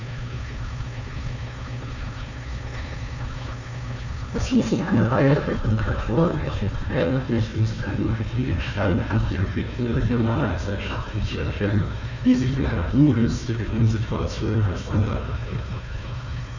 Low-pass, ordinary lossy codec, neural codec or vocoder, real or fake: 7.2 kHz; none; codec, 16 kHz, 1 kbps, FunCodec, trained on Chinese and English, 50 frames a second; fake